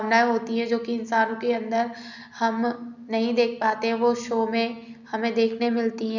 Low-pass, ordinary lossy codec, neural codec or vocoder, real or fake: 7.2 kHz; none; none; real